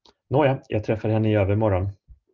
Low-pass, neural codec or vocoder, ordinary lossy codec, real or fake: 7.2 kHz; none; Opus, 32 kbps; real